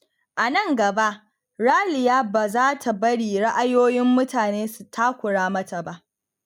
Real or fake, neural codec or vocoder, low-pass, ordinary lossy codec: real; none; 19.8 kHz; none